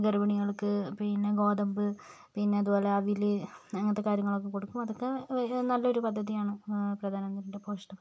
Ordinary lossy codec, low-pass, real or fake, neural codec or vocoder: none; none; real; none